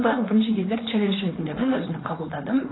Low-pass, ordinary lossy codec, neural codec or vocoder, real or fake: 7.2 kHz; AAC, 16 kbps; codec, 16 kHz, 4.8 kbps, FACodec; fake